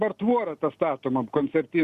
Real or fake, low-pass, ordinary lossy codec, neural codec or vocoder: real; 14.4 kHz; Opus, 64 kbps; none